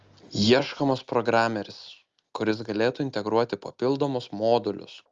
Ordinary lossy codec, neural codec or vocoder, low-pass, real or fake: Opus, 32 kbps; none; 7.2 kHz; real